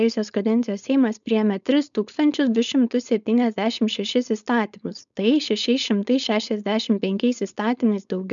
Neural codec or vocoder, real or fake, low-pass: codec, 16 kHz, 4.8 kbps, FACodec; fake; 7.2 kHz